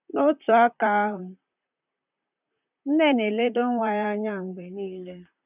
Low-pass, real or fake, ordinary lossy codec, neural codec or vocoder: 3.6 kHz; fake; none; vocoder, 44.1 kHz, 128 mel bands, Pupu-Vocoder